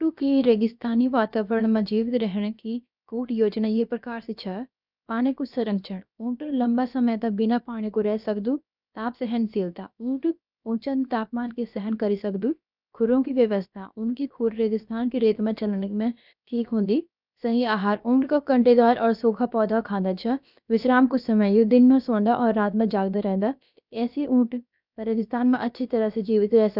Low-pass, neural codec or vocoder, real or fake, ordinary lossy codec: 5.4 kHz; codec, 16 kHz, about 1 kbps, DyCAST, with the encoder's durations; fake; none